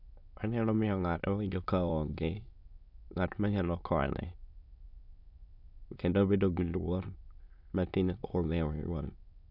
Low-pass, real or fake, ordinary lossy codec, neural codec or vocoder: 5.4 kHz; fake; none; autoencoder, 22.05 kHz, a latent of 192 numbers a frame, VITS, trained on many speakers